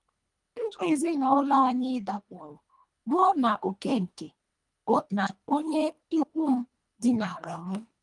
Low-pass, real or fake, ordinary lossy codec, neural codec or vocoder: 10.8 kHz; fake; Opus, 32 kbps; codec, 24 kHz, 1.5 kbps, HILCodec